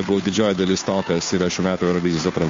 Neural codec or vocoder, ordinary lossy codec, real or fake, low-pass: codec, 16 kHz, 2 kbps, FunCodec, trained on Chinese and English, 25 frames a second; MP3, 48 kbps; fake; 7.2 kHz